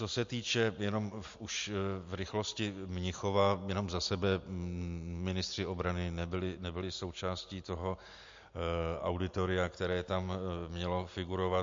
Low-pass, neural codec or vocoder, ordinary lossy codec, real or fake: 7.2 kHz; none; MP3, 48 kbps; real